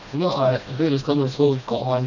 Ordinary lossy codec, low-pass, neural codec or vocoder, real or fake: none; 7.2 kHz; codec, 16 kHz, 1 kbps, FreqCodec, smaller model; fake